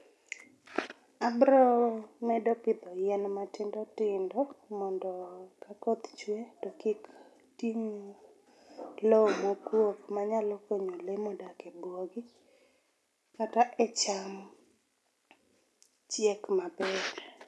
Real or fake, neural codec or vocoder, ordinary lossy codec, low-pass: real; none; none; none